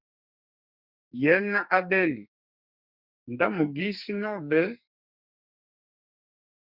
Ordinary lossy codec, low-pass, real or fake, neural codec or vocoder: Opus, 64 kbps; 5.4 kHz; fake; codec, 44.1 kHz, 2.6 kbps, SNAC